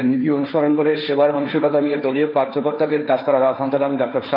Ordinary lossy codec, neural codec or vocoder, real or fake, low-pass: none; codec, 16 kHz, 1.1 kbps, Voila-Tokenizer; fake; 5.4 kHz